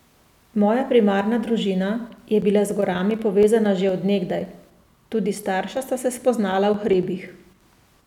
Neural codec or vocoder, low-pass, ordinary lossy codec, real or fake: none; 19.8 kHz; none; real